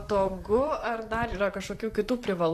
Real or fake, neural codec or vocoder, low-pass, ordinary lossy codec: fake; vocoder, 44.1 kHz, 128 mel bands, Pupu-Vocoder; 14.4 kHz; AAC, 64 kbps